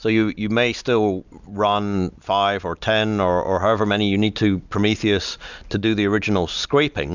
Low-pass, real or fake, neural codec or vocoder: 7.2 kHz; real; none